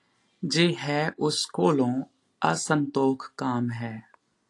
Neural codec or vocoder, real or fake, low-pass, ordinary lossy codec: none; real; 10.8 kHz; AAC, 48 kbps